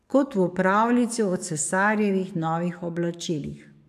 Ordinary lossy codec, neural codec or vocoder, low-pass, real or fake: AAC, 96 kbps; codec, 44.1 kHz, 7.8 kbps, DAC; 14.4 kHz; fake